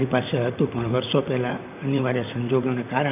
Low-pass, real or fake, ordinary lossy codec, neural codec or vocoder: 3.6 kHz; fake; none; vocoder, 44.1 kHz, 128 mel bands, Pupu-Vocoder